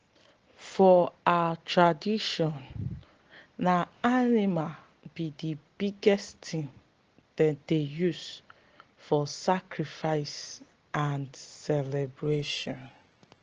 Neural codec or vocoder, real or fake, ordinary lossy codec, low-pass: none; real; Opus, 16 kbps; 7.2 kHz